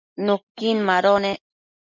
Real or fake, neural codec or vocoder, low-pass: real; none; 7.2 kHz